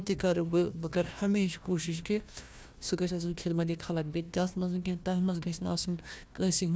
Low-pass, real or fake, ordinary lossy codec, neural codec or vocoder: none; fake; none; codec, 16 kHz, 1 kbps, FunCodec, trained on Chinese and English, 50 frames a second